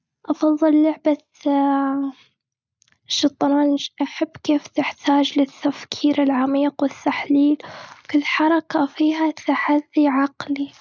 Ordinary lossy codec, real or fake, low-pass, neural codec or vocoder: none; real; none; none